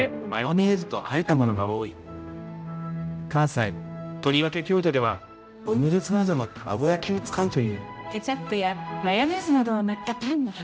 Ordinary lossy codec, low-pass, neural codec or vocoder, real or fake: none; none; codec, 16 kHz, 0.5 kbps, X-Codec, HuBERT features, trained on general audio; fake